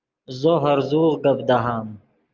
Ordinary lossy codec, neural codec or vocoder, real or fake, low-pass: Opus, 24 kbps; none; real; 7.2 kHz